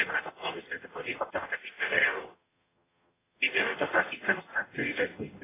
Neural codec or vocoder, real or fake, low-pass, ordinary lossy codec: codec, 44.1 kHz, 0.9 kbps, DAC; fake; 3.6 kHz; AAC, 16 kbps